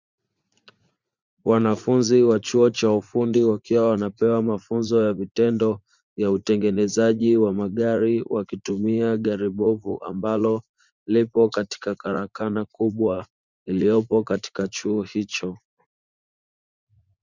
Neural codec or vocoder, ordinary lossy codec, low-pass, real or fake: vocoder, 44.1 kHz, 80 mel bands, Vocos; Opus, 64 kbps; 7.2 kHz; fake